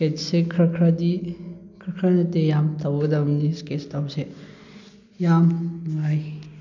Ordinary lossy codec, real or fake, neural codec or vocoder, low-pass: none; real; none; 7.2 kHz